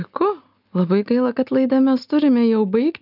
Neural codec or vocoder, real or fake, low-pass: none; real; 5.4 kHz